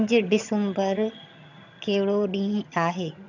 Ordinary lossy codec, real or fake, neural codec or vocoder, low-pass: none; fake; vocoder, 22.05 kHz, 80 mel bands, HiFi-GAN; 7.2 kHz